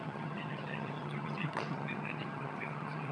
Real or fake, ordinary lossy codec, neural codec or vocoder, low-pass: fake; none; vocoder, 22.05 kHz, 80 mel bands, HiFi-GAN; none